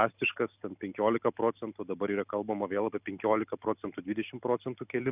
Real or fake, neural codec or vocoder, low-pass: real; none; 3.6 kHz